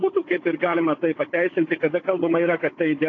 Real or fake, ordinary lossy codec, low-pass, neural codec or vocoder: fake; AAC, 32 kbps; 7.2 kHz; codec, 16 kHz, 16 kbps, FunCodec, trained on Chinese and English, 50 frames a second